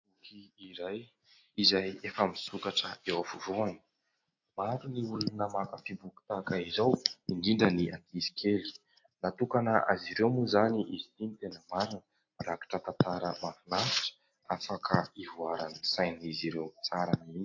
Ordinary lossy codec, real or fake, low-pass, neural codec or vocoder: AAC, 48 kbps; real; 7.2 kHz; none